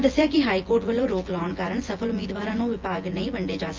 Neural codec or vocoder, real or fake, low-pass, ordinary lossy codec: vocoder, 24 kHz, 100 mel bands, Vocos; fake; 7.2 kHz; Opus, 32 kbps